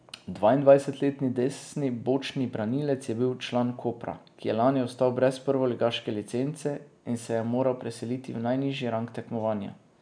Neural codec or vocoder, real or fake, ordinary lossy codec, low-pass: none; real; none; 9.9 kHz